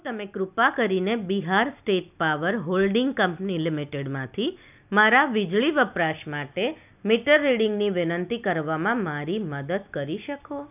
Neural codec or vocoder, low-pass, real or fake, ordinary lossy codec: none; 3.6 kHz; real; none